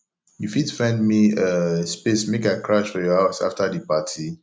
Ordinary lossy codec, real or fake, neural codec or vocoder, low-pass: none; real; none; none